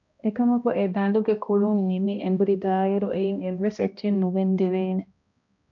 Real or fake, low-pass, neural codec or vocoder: fake; 7.2 kHz; codec, 16 kHz, 1 kbps, X-Codec, HuBERT features, trained on balanced general audio